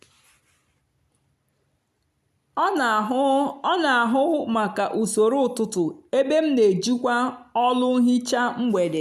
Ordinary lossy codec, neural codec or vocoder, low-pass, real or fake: none; none; 14.4 kHz; real